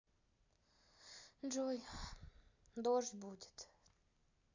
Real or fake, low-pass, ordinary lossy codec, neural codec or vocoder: real; 7.2 kHz; AAC, 48 kbps; none